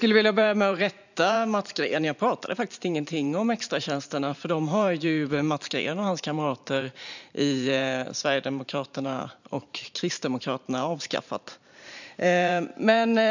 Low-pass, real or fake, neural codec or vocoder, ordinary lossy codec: 7.2 kHz; fake; vocoder, 44.1 kHz, 128 mel bands every 512 samples, BigVGAN v2; none